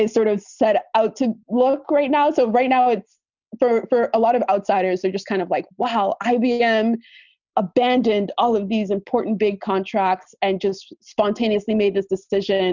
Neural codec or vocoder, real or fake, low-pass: none; real; 7.2 kHz